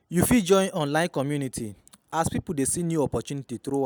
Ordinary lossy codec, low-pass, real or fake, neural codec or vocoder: none; none; real; none